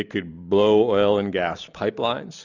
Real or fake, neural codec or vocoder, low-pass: real; none; 7.2 kHz